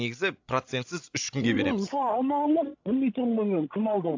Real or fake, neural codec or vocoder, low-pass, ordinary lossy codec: fake; codec, 16 kHz, 16 kbps, FreqCodec, larger model; 7.2 kHz; AAC, 48 kbps